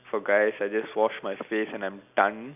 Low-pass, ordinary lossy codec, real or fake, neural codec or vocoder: 3.6 kHz; none; real; none